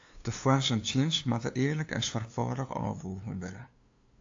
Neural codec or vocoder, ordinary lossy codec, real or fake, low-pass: codec, 16 kHz, 2 kbps, FunCodec, trained on LibriTTS, 25 frames a second; AAC, 48 kbps; fake; 7.2 kHz